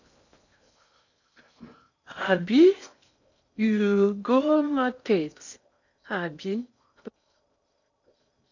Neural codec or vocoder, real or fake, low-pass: codec, 16 kHz in and 24 kHz out, 0.6 kbps, FocalCodec, streaming, 4096 codes; fake; 7.2 kHz